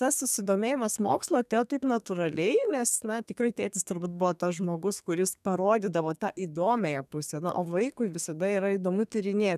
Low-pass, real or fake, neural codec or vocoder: 14.4 kHz; fake; codec, 32 kHz, 1.9 kbps, SNAC